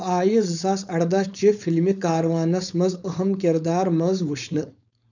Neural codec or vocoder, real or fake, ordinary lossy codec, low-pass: codec, 16 kHz, 4.8 kbps, FACodec; fake; none; 7.2 kHz